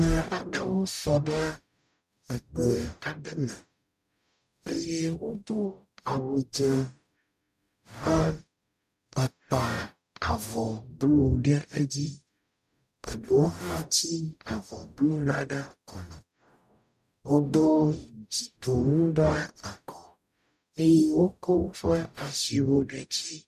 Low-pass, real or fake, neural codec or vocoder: 14.4 kHz; fake; codec, 44.1 kHz, 0.9 kbps, DAC